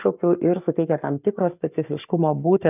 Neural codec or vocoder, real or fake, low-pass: codec, 44.1 kHz, 7.8 kbps, Pupu-Codec; fake; 3.6 kHz